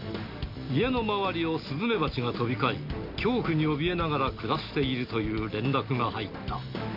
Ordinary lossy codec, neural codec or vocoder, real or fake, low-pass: none; none; real; 5.4 kHz